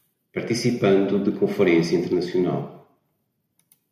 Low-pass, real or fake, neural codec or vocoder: 14.4 kHz; real; none